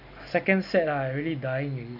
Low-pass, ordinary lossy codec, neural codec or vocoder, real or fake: 5.4 kHz; none; none; real